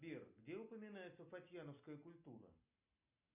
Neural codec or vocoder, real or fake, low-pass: none; real; 3.6 kHz